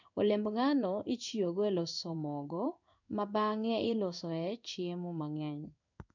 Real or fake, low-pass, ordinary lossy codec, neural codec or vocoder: fake; 7.2 kHz; none; codec, 16 kHz in and 24 kHz out, 1 kbps, XY-Tokenizer